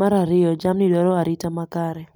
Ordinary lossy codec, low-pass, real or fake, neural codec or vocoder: none; none; real; none